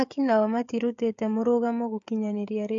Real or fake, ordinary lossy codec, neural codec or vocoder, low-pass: fake; AAC, 64 kbps; codec, 16 kHz, 16 kbps, FreqCodec, smaller model; 7.2 kHz